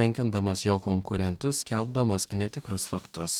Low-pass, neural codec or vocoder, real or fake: 19.8 kHz; codec, 44.1 kHz, 2.6 kbps, DAC; fake